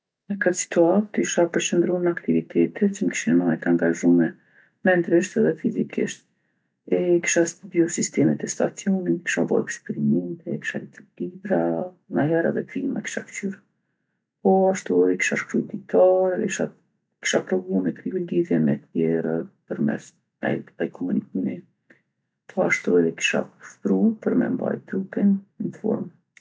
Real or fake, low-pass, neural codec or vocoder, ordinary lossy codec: real; none; none; none